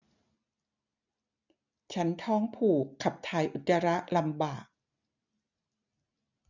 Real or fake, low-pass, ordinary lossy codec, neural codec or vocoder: real; 7.2 kHz; none; none